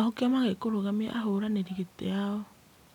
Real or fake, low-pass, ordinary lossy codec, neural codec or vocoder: real; 19.8 kHz; none; none